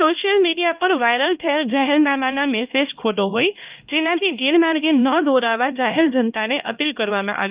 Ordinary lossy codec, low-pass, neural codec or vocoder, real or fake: Opus, 24 kbps; 3.6 kHz; codec, 16 kHz, 1 kbps, X-Codec, HuBERT features, trained on LibriSpeech; fake